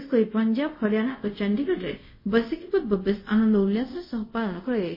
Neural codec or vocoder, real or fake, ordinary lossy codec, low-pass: codec, 24 kHz, 0.5 kbps, DualCodec; fake; MP3, 24 kbps; 5.4 kHz